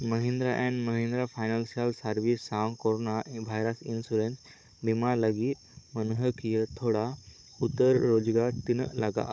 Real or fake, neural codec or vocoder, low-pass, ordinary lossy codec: fake; codec, 16 kHz, 16 kbps, FunCodec, trained on Chinese and English, 50 frames a second; none; none